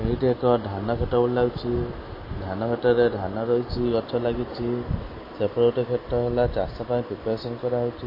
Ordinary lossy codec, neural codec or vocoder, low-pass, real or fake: MP3, 24 kbps; none; 5.4 kHz; real